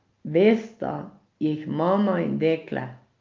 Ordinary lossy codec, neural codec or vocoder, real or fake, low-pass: Opus, 32 kbps; none; real; 7.2 kHz